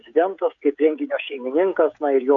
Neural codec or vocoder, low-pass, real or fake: codec, 16 kHz, 16 kbps, FreqCodec, smaller model; 7.2 kHz; fake